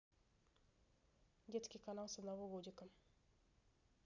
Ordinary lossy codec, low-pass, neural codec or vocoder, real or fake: none; 7.2 kHz; none; real